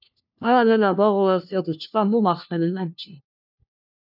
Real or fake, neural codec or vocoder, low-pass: fake; codec, 16 kHz, 1 kbps, FunCodec, trained on LibriTTS, 50 frames a second; 5.4 kHz